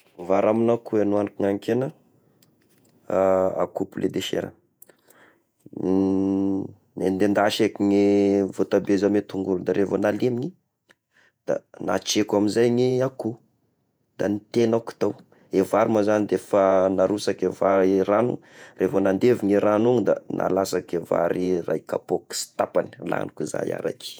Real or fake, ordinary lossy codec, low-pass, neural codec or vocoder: fake; none; none; vocoder, 48 kHz, 128 mel bands, Vocos